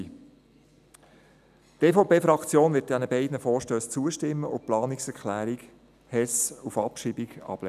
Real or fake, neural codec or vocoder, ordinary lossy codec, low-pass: real; none; none; 14.4 kHz